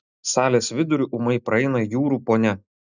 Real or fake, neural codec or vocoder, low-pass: real; none; 7.2 kHz